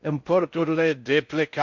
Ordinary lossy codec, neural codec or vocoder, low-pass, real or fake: MP3, 48 kbps; codec, 16 kHz in and 24 kHz out, 0.6 kbps, FocalCodec, streaming, 4096 codes; 7.2 kHz; fake